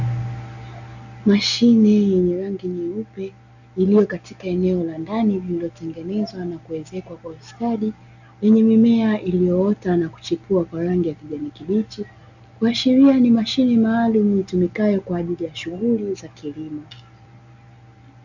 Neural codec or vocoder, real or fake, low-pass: none; real; 7.2 kHz